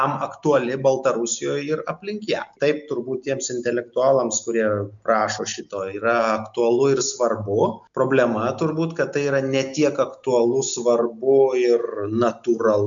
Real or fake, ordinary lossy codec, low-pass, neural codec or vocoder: real; MP3, 64 kbps; 7.2 kHz; none